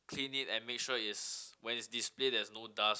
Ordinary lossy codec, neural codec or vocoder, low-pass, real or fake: none; none; none; real